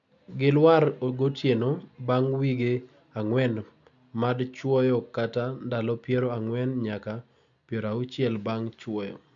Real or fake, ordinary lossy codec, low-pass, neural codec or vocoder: real; MP3, 64 kbps; 7.2 kHz; none